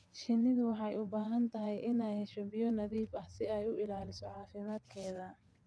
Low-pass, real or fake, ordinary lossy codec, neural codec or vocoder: none; fake; none; vocoder, 22.05 kHz, 80 mel bands, WaveNeXt